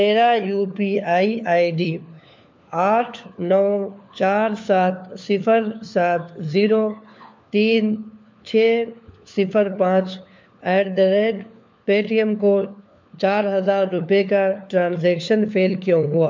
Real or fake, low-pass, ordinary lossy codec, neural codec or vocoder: fake; 7.2 kHz; MP3, 64 kbps; codec, 16 kHz, 4 kbps, FunCodec, trained on LibriTTS, 50 frames a second